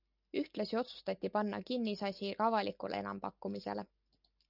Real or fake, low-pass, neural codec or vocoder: real; 5.4 kHz; none